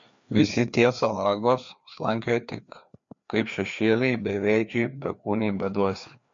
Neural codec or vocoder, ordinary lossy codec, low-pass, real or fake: codec, 16 kHz, 2 kbps, FreqCodec, larger model; MP3, 48 kbps; 7.2 kHz; fake